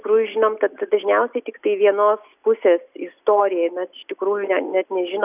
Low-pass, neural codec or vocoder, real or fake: 3.6 kHz; none; real